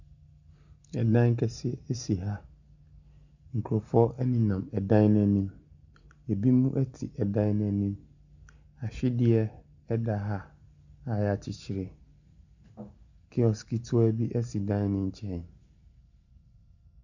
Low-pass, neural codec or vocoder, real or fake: 7.2 kHz; none; real